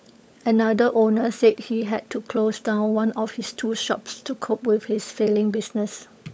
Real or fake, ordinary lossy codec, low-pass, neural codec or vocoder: fake; none; none; codec, 16 kHz, 16 kbps, FunCodec, trained on LibriTTS, 50 frames a second